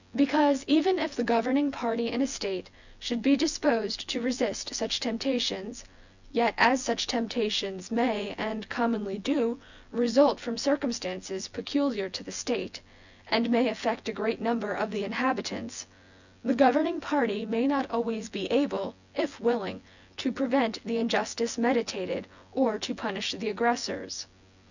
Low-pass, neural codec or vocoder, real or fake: 7.2 kHz; vocoder, 24 kHz, 100 mel bands, Vocos; fake